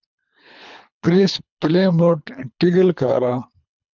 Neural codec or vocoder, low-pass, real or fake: codec, 24 kHz, 3 kbps, HILCodec; 7.2 kHz; fake